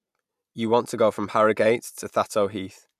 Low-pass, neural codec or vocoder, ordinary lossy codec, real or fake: 14.4 kHz; none; MP3, 96 kbps; real